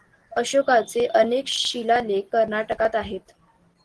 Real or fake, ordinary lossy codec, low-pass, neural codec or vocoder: real; Opus, 16 kbps; 10.8 kHz; none